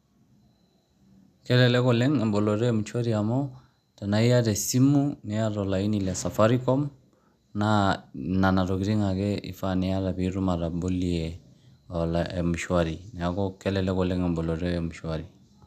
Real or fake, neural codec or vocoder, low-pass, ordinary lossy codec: real; none; 14.4 kHz; none